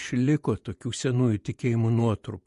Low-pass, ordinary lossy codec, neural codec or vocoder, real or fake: 14.4 kHz; MP3, 48 kbps; vocoder, 48 kHz, 128 mel bands, Vocos; fake